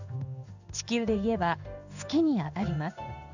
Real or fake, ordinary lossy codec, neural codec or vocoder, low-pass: fake; none; codec, 16 kHz in and 24 kHz out, 1 kbps, XY-Tokenizer; 7.2 kHz